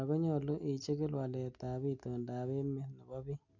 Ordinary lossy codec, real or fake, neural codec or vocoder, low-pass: none; real; none; 7.2 kHz